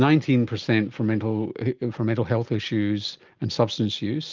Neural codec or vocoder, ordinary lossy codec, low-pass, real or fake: none; Opus, 32 kbps; 7.2 kHz; real